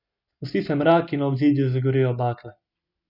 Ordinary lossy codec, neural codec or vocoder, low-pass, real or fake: none; none; 5.4 kHz; real